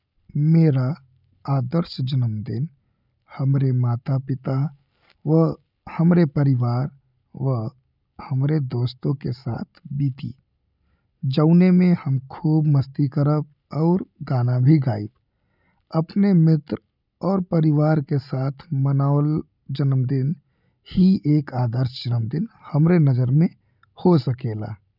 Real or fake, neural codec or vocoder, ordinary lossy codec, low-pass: real; none; none; 5.4 kHz